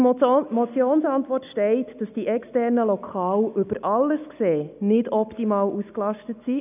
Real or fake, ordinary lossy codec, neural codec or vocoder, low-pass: real; none; none; 3.6 kHz